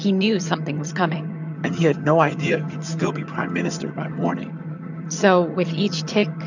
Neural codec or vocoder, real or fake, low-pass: vocoder, 22.05 kHz, 80 mel bands, HiFi-GAN; fake; 7.2 kHz